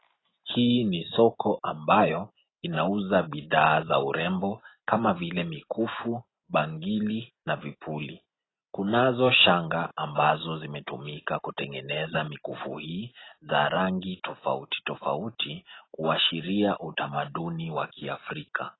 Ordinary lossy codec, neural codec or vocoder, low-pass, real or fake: AAC, 16 kbps; none; 7.2 kHz; real